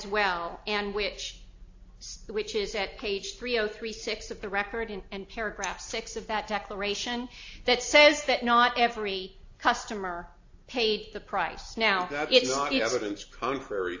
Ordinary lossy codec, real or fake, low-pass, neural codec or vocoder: AAC, 48 kbps; real; 7.2 kHz; none